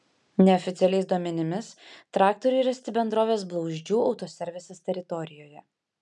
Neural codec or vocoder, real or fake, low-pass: none; real; 10.8 kHz